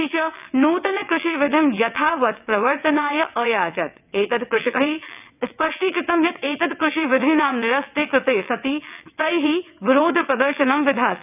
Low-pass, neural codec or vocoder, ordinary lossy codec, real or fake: 3.6 kHz; vocoder, 22.05 kHz, 80 mel bands, WaveNeXt; none; fake